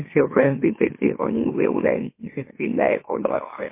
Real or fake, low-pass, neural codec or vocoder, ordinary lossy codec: fake; 3.6 kHz; autoencoder, 44.1 kHz, a latent of 192 numbers a frame, MeloTTS; MP3, 24 kbps